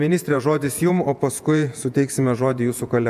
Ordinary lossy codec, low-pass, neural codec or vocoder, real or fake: MP3, 96 kbps; 14.4 kHz; vocoder, 48 kHz, 128 mel bands, Vocos; fake